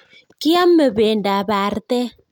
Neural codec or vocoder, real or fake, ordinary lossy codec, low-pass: none; real; none; 19.8 kHz